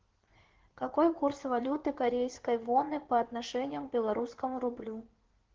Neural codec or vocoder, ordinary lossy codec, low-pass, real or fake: codec, 16 kHz in and 24 kHz out, 2.2 kbps, FireRedTTS-2 codec; Opus, 16 kbps; 7.2 kHz; fake